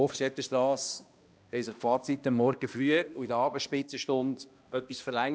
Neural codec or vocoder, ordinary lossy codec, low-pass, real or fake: codec, 16 kHz, 1 kbps, X-Codec, HuBERT features, trained on balanced general audio; none; none; fake